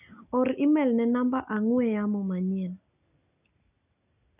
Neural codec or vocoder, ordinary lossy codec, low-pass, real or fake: none; none; 3.6 kHz; real